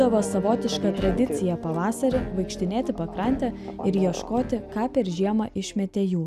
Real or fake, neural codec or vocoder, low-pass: real; none; 14.4 kHz